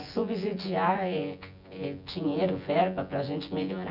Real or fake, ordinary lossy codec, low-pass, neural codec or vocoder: fake; none; 5.4 kHz; vocoder, 24 kHz, 100 mel bands, Vocos